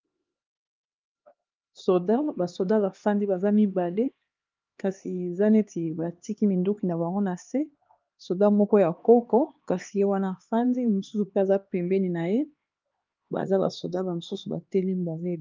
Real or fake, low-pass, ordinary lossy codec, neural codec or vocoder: fake; 7.2 kHz; Opus, 32 kbps; codec, 16 kHz, 2 kbps, X-Codec, HuBERT features, trained on LibriSpeech